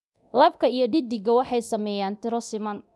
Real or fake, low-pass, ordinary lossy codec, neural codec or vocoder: fake; none; none; codec, 24 kHz, 0.9 kbps, DualCodec